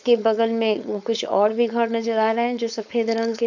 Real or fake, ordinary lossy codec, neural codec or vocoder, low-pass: fake; none; codec, 16 kHz, 4.8 kbps, FACodec; 7.2 kHz